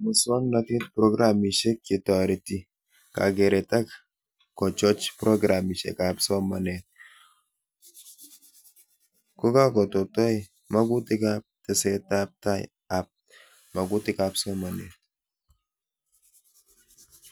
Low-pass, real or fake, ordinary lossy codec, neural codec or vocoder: none; real; none; none